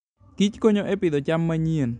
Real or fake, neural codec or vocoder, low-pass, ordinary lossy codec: real; none; 9.9 kHz; MP3, 64 kbps